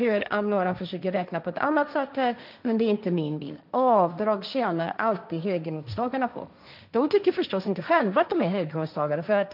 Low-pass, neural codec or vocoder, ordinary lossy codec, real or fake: 5.4 kHz; codec, 16 kHz, 1.1 kbps, Voila-Tokenizer; none; fake